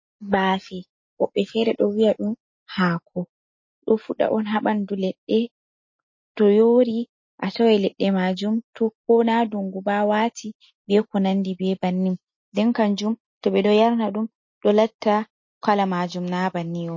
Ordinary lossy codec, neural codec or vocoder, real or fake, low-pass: MP3, 32 kbps; none; real; 7.2 kHz